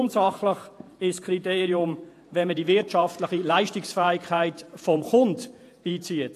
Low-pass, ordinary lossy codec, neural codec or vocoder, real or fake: 14.4 kHz; AAC, 64 kbps; vocoder, 48 kHz, 128 mel bands, Vocos; fake